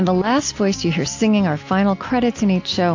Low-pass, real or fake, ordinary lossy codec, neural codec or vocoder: 7.2 kHz; real; AAC, 48 kbps; none